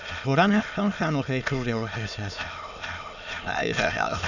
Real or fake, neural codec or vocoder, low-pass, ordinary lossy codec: fake; autoencoder, 22.05 kHz, a latent of 192 numbers a frame, VITS, trained on many speakers; 7.2 kHz; none